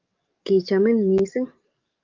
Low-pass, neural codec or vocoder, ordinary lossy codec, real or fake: 7.2 kHz; codec, 44.1 kHz, 7.8 kbps, DAC; Opus, 24 kbps; fake